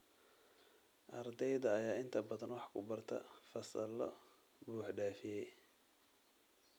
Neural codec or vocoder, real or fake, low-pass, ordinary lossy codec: none; real; none; none